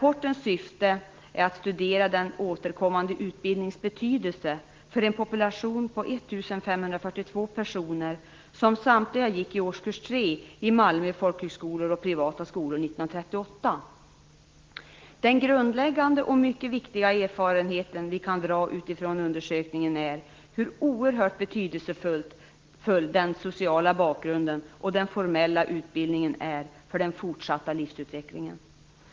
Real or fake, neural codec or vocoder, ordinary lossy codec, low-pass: real; none; Opus, 16 kbps; 7.2 kHz